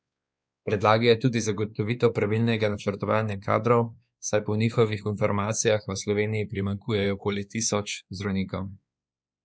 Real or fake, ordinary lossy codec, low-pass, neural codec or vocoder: fake; none; none; codec, 16 kHz, 2 kbps, X-Codec, WavLM features, trained on Multilingual LibriSpeech